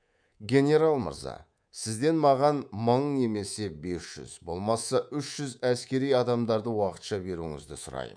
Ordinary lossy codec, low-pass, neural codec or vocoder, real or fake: none; 9.9 kHz; codec, 24 kHz, 3.1 kbps, DualCodec; fake